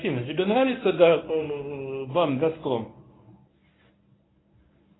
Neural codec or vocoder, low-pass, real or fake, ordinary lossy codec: codec, 24 kHz, 0.9 kbps, WavTokenizer, medium speech release version 1; 7.2 kHz; fake; AAC, 16 kbps